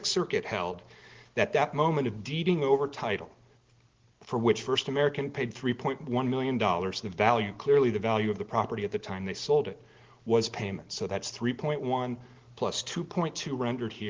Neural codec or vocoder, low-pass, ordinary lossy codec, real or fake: none; 7.2 kHz; Opus, 16 kbps; real